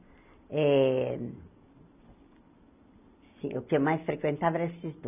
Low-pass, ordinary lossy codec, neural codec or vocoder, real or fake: 3.6 kHz; none; none; real